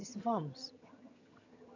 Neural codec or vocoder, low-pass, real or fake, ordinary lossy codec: vocoder, 22.05 kHz, 80 mel bands, HiFi-GAN; 7.2 kHz; fake; none